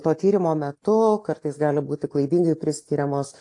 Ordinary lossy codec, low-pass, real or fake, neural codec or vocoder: AAC, 48 kbps; 10.8 kHz; real; none